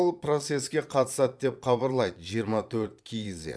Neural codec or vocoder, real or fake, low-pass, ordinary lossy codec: vocoder, 22.05 kHz, 80 mel bands, Vocos; fake; none; none